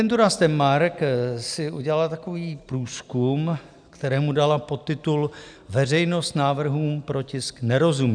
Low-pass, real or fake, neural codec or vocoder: 9.9 kHz; real; none